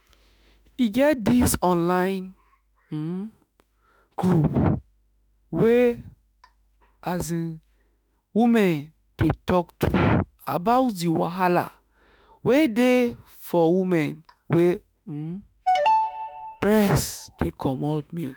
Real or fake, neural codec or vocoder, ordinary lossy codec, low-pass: fake; autoencoder, 48 kHz, 32 numbers a frame, DAC-VAE, trained on Japanese speech; none; none